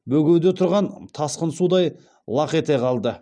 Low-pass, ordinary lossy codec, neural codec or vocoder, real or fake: 9.9 kHz; none; none; real